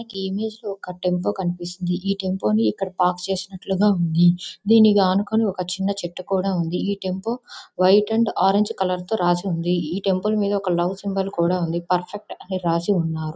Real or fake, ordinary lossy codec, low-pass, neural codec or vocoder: real; none; none; none